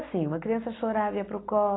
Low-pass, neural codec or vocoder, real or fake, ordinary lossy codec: 7.2 kHz; none; real; AAC, 16 kbps